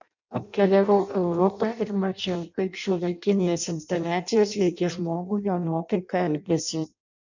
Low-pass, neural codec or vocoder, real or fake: 7.2 kHz; codec, 16 kHz in and 24 kHz out, 0.6 kbps, FireRedTTS-2 codec; fake